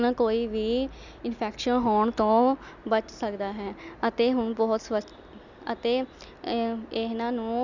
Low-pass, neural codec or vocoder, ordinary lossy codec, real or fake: 7.2 kHz; none; none; real